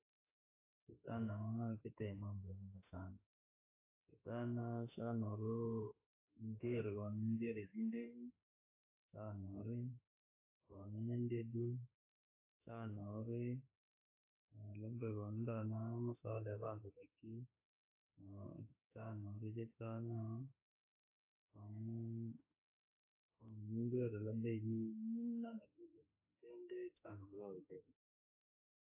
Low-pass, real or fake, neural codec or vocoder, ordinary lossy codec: 3.6 kHz; fake; autoencoder, 48 kHz, 32 numbers a frame, DAC-VAE, trained on Japanese speech; AAC, 24 kbps